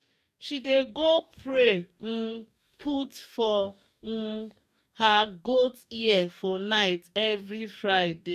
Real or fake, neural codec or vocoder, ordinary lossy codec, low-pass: fake; codec, 44.1 kHz, 2.6 kbps, DAC; none; 14.4 kHz